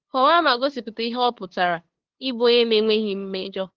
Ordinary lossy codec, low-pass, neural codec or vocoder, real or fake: Opus, 16 kbps; 7.2 kHz; codec, 16 kHz, 2 kbps, FunCodec, trained on LibriTTS, 25 frames a second; fake